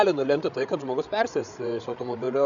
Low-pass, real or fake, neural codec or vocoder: 7.2 kHz; fake; codec, 16 kHz, 8 kbps, FreqCodec, larger model